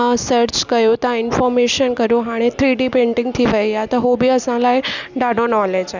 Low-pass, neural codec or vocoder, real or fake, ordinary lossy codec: 7.2 kHz; none; real; none